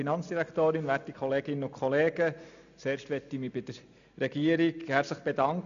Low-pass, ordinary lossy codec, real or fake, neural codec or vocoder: 7.2 kHz; none; real; none